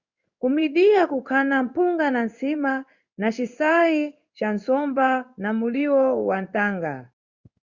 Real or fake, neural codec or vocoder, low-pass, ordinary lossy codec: fake; codec, 16 kHz in and 24 kHz out, 1 kbps, XY-Tokenizer; 7.2 kHz; Opus, 64 kbps